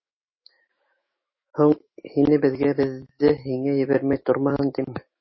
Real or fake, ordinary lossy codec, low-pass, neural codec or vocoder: fake; MP3, 24 kbps; 7.2 kHz; autoencoder, 48 kHz, 128 numbers a frame, DAC-VAE, trained on Japanese speech